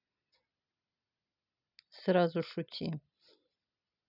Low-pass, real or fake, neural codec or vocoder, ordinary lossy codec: 5.4 kHz; real; none; none